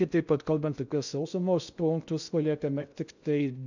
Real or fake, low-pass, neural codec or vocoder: fake; 7.2 kHz; codec, 16 kHz in and 24 kHz out, 0.6 kbps, FocalCodec, streaming, 2048 codes